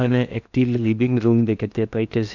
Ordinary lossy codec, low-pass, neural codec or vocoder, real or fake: none; 7.2 kHz; codec, 16 kHz in and 24 kHz out, 0.6 kbps, FocalCodec, streaming, 2048 codes; fake